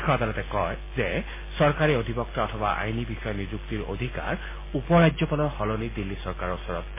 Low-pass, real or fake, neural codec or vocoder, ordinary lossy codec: 3.6 kHz; real; none; MP3, 16 kbps